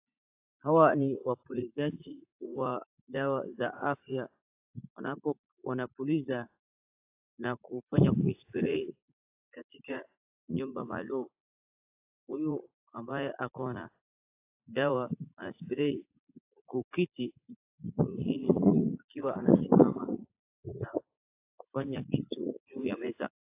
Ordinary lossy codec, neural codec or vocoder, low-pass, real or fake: AAC, 24 kbps; vocoder, 44.1 kHz, 80 mel bands, Vocos; 3.6 kHz; fake